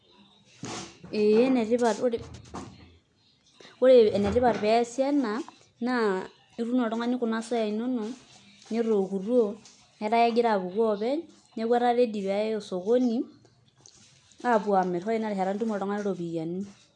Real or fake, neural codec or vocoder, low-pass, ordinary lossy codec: real; none; 10.8 kHz; none